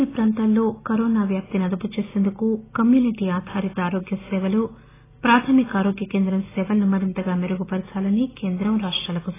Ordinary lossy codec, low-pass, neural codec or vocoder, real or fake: AAC, 16 kbps; 3.6 kHz; none; real